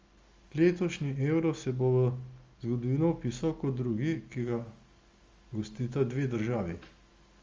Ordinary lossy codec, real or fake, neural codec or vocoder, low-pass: Opus, 32 kbps; real; none; 7.2 kHz